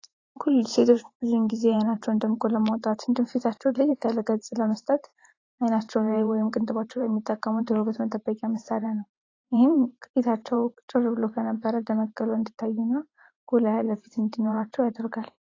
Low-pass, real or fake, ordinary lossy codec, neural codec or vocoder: 7.2 kHz; fake; AAC, 32 kbps; vocoder, 44.1 kHz, 128 mel bands every 512 samples, BigVGAN v2